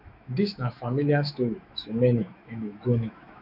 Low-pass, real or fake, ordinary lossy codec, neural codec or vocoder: 5.4 kHz; fake; none; autoencoder, 48 kHz, 128 numbers a frame, DAC-VAE, trained on Japanese speech